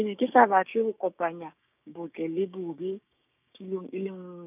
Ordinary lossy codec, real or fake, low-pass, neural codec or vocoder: none; fake; 3.6 kHz; codec, 16 kHz, 6 kbps, DAC